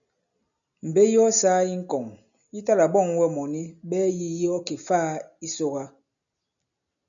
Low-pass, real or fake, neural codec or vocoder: 7.2 kHz; real; none